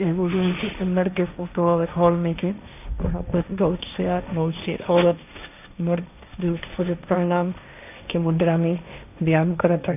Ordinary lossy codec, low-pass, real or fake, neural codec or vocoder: none; 3.6 kHz; fake; codec, 16 kHz, 1.1 kbps, Voila-Tokenizer